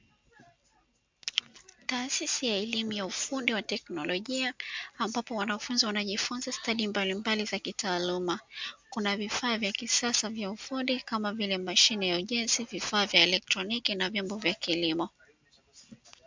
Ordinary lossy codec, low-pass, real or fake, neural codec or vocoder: MP3, 64 kbps; 7.2 kHz; real; none